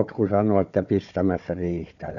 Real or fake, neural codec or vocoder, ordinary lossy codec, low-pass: fake; codec, 16 kHz, 4 kbps, FunCodec, trained on Chinese and English, 50 frames a second; none; 7.2 kHz